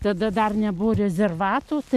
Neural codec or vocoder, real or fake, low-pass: none; real; 14.4 kHz